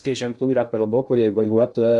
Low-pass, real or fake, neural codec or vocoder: 10.8 kHz; fake; codec, 16 kHz in and 24 kHz out, 0.6 kbps, FocalCodec, streaming, 2048 codes